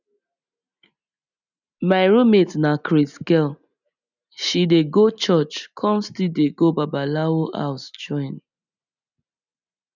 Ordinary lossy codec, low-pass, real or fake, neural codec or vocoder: none; 7.2 kHz; real; none